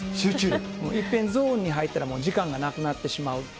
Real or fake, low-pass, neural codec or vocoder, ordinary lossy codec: real; none; none; none